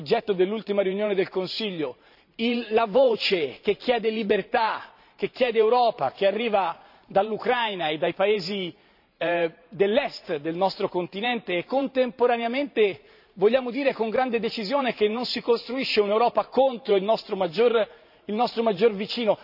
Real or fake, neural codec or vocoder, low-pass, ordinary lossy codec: fake; vocoder, 44.1 kHz, 128 mel bands every 512 samples, BigVGAN v2; 5.4 kHz; none